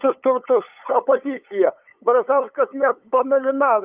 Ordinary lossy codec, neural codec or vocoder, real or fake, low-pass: Opus, 24 kbps; codec, 16 kHz, 8 kbps, FunCodec, trained on LibriTTS, 25 frames a second; fake; 3.6 kHz